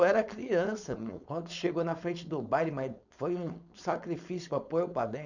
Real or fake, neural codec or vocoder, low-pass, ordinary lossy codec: fake; codec, 16 kHz, 4.8 kbps, FACodec; 7.2 kHz; none